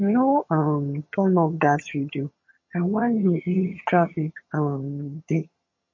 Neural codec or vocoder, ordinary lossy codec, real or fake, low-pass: vocoder, 22.05 kHz, 80 mel bands, HiFi-GAN; MP3, 32 kbps; fake; 7.2 kHz